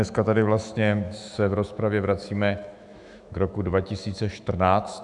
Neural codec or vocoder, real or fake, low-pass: autoencoder, 48 kHz, 128 numbers a frame, DAC-VAE, trained on Japanese speech; fake; 10.8 kHz